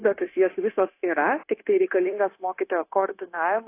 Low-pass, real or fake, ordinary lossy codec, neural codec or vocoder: 3.6 kHz; fake; AAC, 24 kbps; codec, 16 kHz, 0.9 kbps, LongCat-Audio-Codec